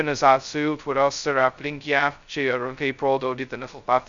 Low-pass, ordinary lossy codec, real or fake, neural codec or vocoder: 7.2 kHz; Opus, 64 kbps; fake; codec, 16 kHz, 0.2 kbps, FocalCodec